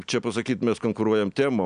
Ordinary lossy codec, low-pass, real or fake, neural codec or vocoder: MP3, 96 kbps; 9.9 kHz; real; none